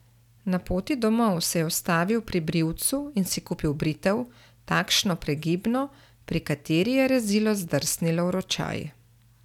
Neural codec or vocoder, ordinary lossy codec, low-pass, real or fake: none; none; 19.8 kHz; real